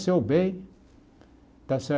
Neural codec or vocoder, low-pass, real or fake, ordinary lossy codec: none; none; real; none